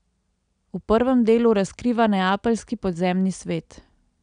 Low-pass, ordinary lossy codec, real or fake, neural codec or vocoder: 9.9 kHz; none; real; none